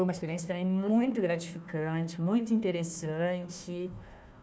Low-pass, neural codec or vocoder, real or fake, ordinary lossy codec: none; codec, 16 kHz, 1 kbps, FunCodec, trained on Chinese and English, 50 frames a second; fake; none